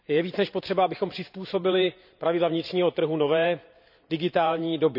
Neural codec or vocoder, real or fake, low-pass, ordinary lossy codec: vocoder, 44.1 kHz, 128 mel bands every 512 samples, BigVGAN v2; fake; 5.4 kHz; none